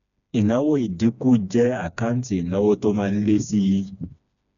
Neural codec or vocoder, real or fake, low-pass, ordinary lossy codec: codec, 16 kHz, 2 kbps, FreqCodec, smaller model; fake; 7.2 kHz; MP3, 96 kbps